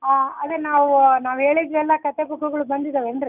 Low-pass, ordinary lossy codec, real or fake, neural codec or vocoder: 3.6 kHz; none; real; none